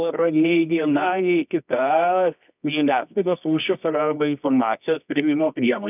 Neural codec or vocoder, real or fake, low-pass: codec, 24 kHz, 0.9 kbps, WavTokenizer, medium music audio release; fake; 3.6 kHz